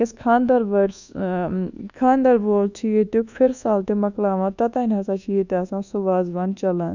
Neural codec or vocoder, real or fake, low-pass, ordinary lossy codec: codec, 24 kHz, 1.2 kbps, DualCodec; fake; 7.2 kHz; none